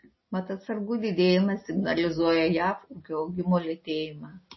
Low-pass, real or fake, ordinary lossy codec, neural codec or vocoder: 7.2 kHz; real; MP3, 24 kbps; none